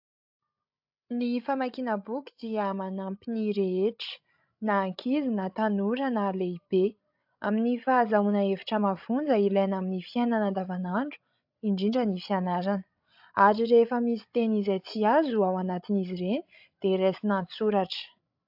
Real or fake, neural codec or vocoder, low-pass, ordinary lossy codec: fake; codec, 16 kHz, 16 kbps, FreqCodec, larger model; 5.4 kHz; AAC, 48 kbps